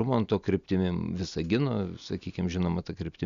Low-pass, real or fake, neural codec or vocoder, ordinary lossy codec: 7.2 kHz; real; none; Opus, 64 kbps